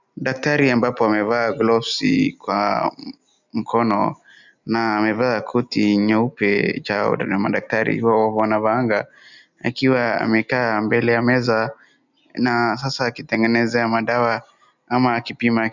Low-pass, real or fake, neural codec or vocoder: 7.2 kHz; real; none